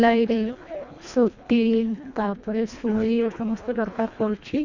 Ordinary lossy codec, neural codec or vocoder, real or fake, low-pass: none; codec, 24 kHz, 1.5 kbps, HILCodec; fake; 7.2 kHz